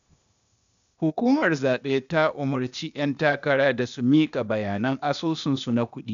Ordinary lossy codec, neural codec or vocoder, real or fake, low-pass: none; codec, 16 kHz, 0.8 kbps, ZipCodec; fake; 7.2 kHz